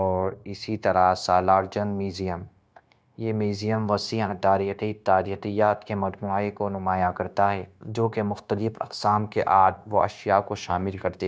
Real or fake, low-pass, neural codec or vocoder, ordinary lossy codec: fake; none; codec, 16 kHz, 0.9 kbps, LongCat-Audio-Codec; none